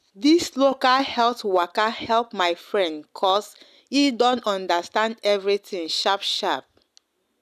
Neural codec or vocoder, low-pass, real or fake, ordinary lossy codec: none; 14.4 kHz; real; none